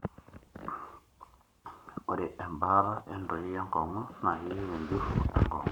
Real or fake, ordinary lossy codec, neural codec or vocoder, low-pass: fake; none; codec, 44.1 kHz, 7.8 kbps, Pupu-Codec; 19.8 kHz